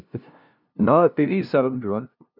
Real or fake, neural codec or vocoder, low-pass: fake; codec, 16 kHz, 0.5 kbps, FunCodec, trained on LibriTTS, 25 frames a second; 5.4 kHz